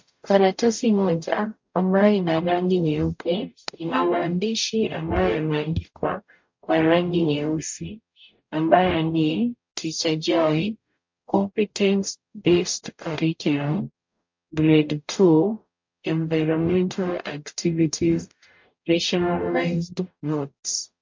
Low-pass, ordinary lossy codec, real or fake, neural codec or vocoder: 7.2 kHz; MP3, 48 kbps; fake; codec, 44.1 kHz, 0.9 kbps, DAC